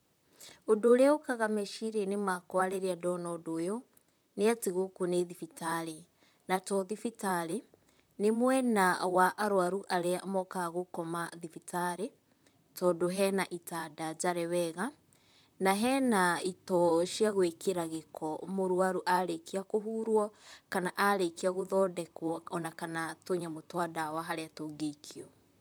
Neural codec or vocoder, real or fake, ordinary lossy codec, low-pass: vocoder, 44.1 kHz, 128 mel bands, Pupu-Vocoder; fake; none; none